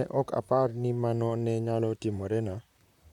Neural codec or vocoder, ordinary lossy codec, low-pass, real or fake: vocoder, 44.1 kHz, 128 mel bands, Pupu-Vocoder; none; 19.8 kHz; fake